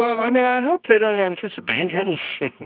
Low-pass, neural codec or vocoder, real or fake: 5.4 kHz; codec, 24 kHz, 0.9 kbps, WavTokenizer, medium music audio release; fake